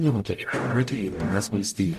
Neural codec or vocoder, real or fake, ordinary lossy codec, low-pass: codec, 44.1 kHz, 0.9 kbps, DAC; fake; MP3, 64 kbps; 14.4 kHz